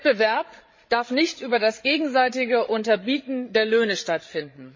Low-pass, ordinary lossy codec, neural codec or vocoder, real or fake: 7.2 kHz; MP3, 32 kbps; codec, 16 kHz, 16 kbps, FreqCodec, larger model; fake